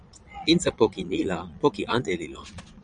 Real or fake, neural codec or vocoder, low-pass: fake; vocoder, 22.05 kHz, 80 mel bands, Vocos; 9.9 kHz